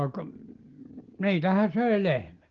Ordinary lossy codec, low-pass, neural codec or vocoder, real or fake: Opus, 16 kbps; 7.2 kHz; none; real